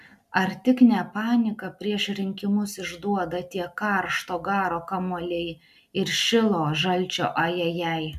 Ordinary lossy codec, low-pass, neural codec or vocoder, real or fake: MP3, 96 kbps; 14.4 kHz; none; real